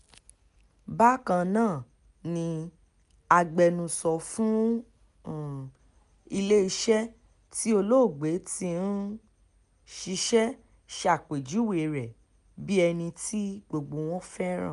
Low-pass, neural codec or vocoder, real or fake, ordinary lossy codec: 10.8 kHz; none; real; none